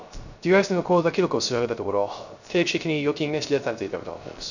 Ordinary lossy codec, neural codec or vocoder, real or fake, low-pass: none; codec, 16 kHz, 0.3 kbps, FocalCodec; fake; 7.2 kHz